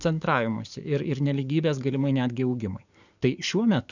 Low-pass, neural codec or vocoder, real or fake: 7.2 kHz; codec, 44.1 kHz, 7.8 kbps, DAC; fake